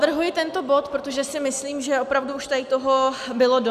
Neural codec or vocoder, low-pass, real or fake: none; 14.4 kHz; real